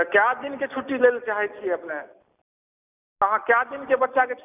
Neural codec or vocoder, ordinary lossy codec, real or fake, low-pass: none; none; real; 3.6 kHz